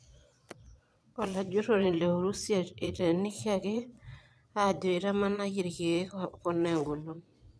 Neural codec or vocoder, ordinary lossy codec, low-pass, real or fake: vocoder, 22.05 kHz, 80 mel bands, Vocos; none; none; fake